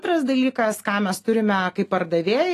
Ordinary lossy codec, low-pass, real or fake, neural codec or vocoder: AAC, 48 kbps; 14.4 kHz; real; none